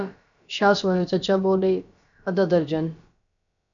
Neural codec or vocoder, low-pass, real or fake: codec, 16 kHz, about 1 kbps, DyCAST, with the encoder's durations; 7.2 kHz; fake